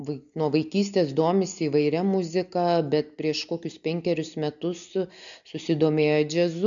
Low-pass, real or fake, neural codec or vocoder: 7.2 kHz; real; none